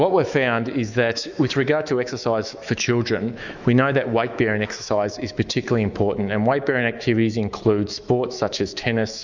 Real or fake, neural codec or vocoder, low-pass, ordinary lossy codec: fake; autoencoder, 48 kHz, 128 numbers a frame, DAC-VAE, trained on Japanese speech; 7.2 kHz; Opus, 64 kbps